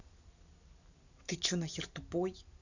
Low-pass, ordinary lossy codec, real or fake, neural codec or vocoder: 7.2 kHz; none; real; none